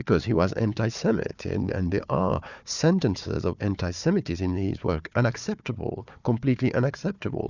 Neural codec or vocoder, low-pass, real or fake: codec, 16 kHz, 4 kbps, FunCodec, trained on Chinese and English, 50 frames a second; 7.2 kHz; fake